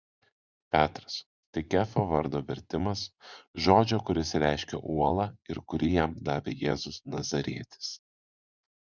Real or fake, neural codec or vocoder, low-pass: fake; vocoder, 24 kHz, 100 mel bands, Vocos; 7.2 kHz